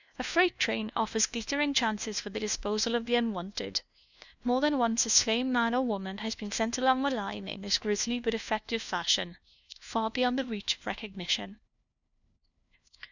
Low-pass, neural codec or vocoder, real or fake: 7.2 kHz; codec, 16 kHz, 1 kbps, FunCodec, trained on LibriTTS, 50 frames a second; fake